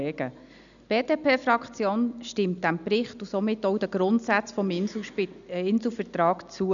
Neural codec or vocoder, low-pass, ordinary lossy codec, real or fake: none; 7.2 kHz; none; real